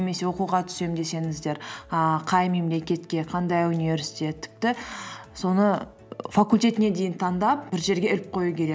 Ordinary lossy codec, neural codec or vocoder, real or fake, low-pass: none; none; real; none